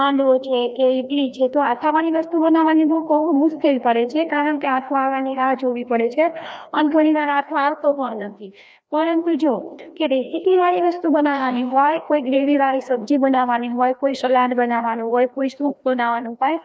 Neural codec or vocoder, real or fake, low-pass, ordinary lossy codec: codec, 16 kHz, 1 kbps, FreqCodec, larger model; fake; none; none